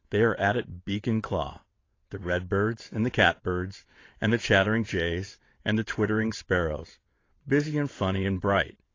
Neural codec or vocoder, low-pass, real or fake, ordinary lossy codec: vocoder, 22.05 kHz, 80 mel bands, WaveNeXt; 7.2 kHz; fake; AAC, 32 kbps